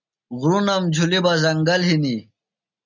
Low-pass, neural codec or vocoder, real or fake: 7.2 kHz; none; real